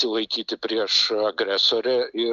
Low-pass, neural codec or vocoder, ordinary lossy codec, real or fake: 7.2 kHz; none; Opus, 64 kbps; real